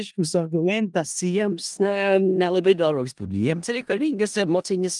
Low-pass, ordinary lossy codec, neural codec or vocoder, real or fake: 10.8 kHz; Opus, 32 kbps; codec, 16 kHz in and 24 kHz out, 0.4 kbps, LongCat-Audio-Codec, four codebook decoder; fake